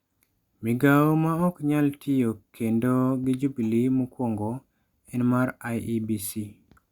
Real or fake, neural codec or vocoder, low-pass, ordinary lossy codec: real; none; 19.8 kHz; Opus, 64 kbps